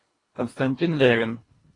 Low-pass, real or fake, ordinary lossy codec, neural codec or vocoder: 10.8 kHz; fake; AAC, 32 kbps; codec, 24 kHz, 1.5 kbps, HILCodec